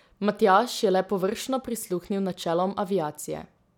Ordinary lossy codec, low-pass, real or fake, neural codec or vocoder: none; 19.8 kHz; real; none